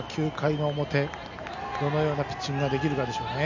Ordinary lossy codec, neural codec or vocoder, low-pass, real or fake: none; none; 7.2 kHz; real